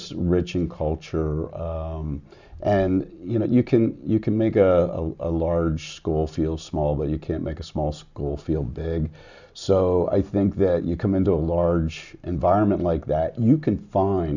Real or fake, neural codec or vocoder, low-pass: real; none; 7.2 kHz